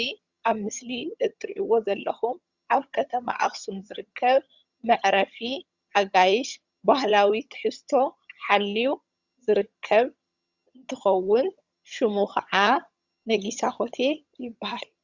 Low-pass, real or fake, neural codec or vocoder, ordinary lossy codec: 7.2 kHz; fake; vocoder, 22.05 kHz, 80 mel bands, HiFi-GAN; Opus, 64 kbps